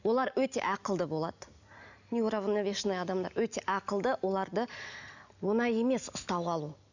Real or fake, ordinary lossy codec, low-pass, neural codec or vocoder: real; none; 7.2 kHz; none